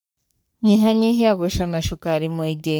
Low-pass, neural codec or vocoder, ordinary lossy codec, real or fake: none; codec, 44.1 kHz, 3.4 kbps, Pupu-Codec; none; fake